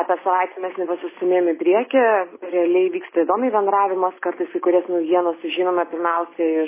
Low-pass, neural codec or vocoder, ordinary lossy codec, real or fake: 3.6 kHz; none; MP3, 16 kbps; real